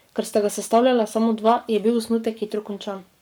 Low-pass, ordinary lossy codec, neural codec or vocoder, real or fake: none; none; codec, 44.1 kHz, 7.8 kbps, Pupu-Codec; fake